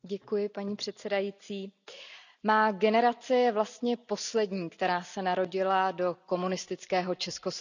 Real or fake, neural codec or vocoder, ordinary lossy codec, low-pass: real; none; none; 7.2 kHz